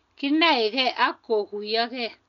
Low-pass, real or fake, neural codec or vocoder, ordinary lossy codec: 7.2 kHz; real; none; none